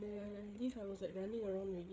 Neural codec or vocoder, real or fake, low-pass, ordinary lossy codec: codec, 16 kHz, 8 kbps, FreqCodec, larger model; fake; none; none